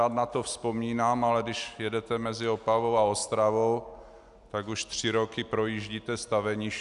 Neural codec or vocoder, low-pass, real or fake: none; 10.8 kHz; real